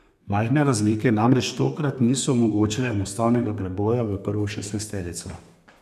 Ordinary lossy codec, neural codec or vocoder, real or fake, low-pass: none; codec, 32 kHz, 1.9 kbps, SNAC; fake; 14.4 kHz